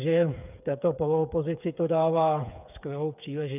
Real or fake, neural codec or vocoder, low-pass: fake; codec, 16 kHz, 8 kbps, FreqCodec, smaller model; 3.6 kHz